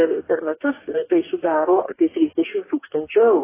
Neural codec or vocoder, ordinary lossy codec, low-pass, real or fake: codec, 44.1 kHz, 2.6 kbps, DAC; AAC, 16 kbps; 3.6 kHz; fake